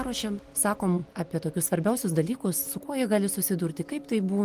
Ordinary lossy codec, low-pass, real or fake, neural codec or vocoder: Opus, 24 kbps; 14.4 kHz; real; none